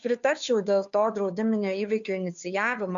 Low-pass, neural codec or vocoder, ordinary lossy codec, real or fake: 7.2 kHz; codec, 16 kHz, 2 kbps, FunCodec, trained on Chinese and English, 25 frames a second; MP3, 64 kbps; fake